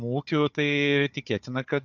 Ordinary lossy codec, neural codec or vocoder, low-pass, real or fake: MP3, 64 kbps; codec, 16 kHz, 4 kbps, FunCodec, trained on Chinese and English, 50 frames a second; 7.2 kHz; fake